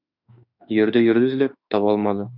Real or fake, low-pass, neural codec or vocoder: fake; 5.4 kHz; autoencoder, 48 kHz, 32 numbers a frame, DAC-VAE, trained on Japanese speech